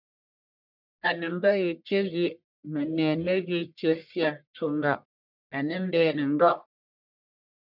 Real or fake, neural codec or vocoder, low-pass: fake; codec, 44.1 kHz, 1.7 kbps, Pupu-Codec; 5.4 kHz